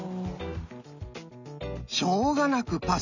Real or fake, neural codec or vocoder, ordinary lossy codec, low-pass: real; none; none; 7.2 kHz